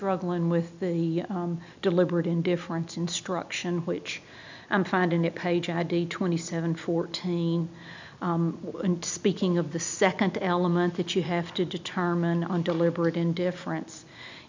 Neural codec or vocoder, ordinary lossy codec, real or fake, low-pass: none; MP3, 64 kbps; real; 7.2 kHz